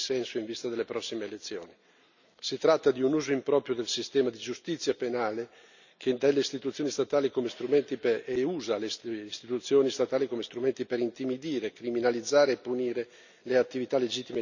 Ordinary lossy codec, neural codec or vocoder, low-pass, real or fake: none; none; 7.2 kHz; real